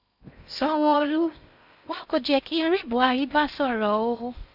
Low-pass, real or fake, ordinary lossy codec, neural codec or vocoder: 5.4 kHz; fake; none; codec, 16 kHz in and 24 kHz out, 0.6 kbps, FocalCodec, streaming, 2048 codes